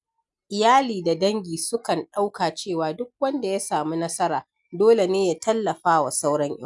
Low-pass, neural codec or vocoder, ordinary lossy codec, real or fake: 10.8 kHz; none; none; real